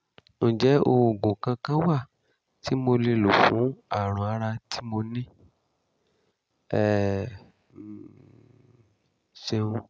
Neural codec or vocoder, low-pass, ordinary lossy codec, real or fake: none; none; none; real